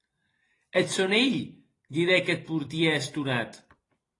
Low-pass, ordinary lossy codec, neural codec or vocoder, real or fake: 10.8 kHz; AAC, 32 kbps; vocoder, 44.1 kHz, 128 mel bands every 256 samples, BigVGAN v2; fake